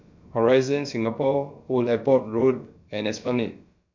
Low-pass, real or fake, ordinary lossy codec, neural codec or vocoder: 7.2 kHz; fake; MP3, 64 kbps; codec, 16 kHz, about 1 kbps, DyCAST, with the encoder's durations